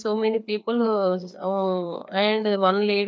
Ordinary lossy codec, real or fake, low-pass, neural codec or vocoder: none; fake; none; codec, 16 kHz, 2 kbps, FreqCodec, larger model